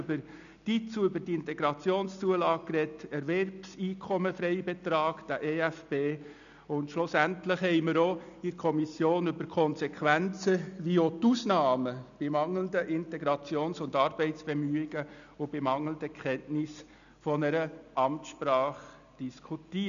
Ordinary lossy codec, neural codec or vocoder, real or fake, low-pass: none; none; real; 7.2 kHz